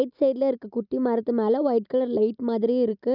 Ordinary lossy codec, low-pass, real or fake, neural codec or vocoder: none; 5.4 kHz; real; none